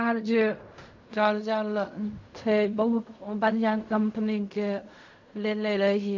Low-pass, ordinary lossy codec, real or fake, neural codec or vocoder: 7.2 kHz; MP3, 48 kbps; fake; codec, 16 kHz in and 24 kHz out, 0.4 kbps, LongCat-Audio-Codec, fine tuned four codebook decoder